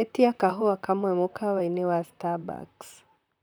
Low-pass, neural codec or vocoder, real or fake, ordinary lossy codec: none; vocoder, 44.1 kHz, 128 mel bands, Pupu-Vocoder; fake; none